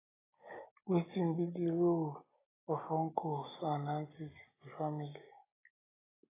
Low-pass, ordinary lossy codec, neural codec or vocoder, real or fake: 3.6 kHz; AAC, 16 kbps; none; real